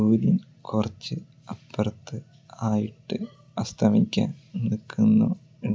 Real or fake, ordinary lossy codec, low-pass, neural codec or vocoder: real; none; none; none